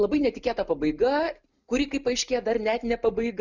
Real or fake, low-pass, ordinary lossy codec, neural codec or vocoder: real; 7.2 kHz; Opus, 64 kbps; none